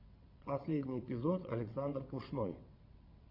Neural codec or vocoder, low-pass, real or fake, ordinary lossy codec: vocoder, 22.05 kHz, 80 mel bands, WaveNeXt; 5.4 kHz; fake; AAC, 32 kbps